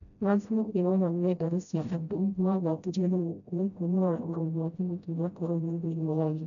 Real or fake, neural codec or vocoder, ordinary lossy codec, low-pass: fake; codec, 16 kHz, 0.5 kbps, FreqCodec, smaller model; none; 7.2 kHz